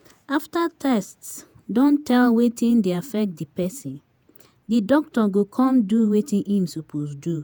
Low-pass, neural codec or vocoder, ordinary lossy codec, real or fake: none; vocoder, 48 kHz, 128 mel bands, Vocos; none; fake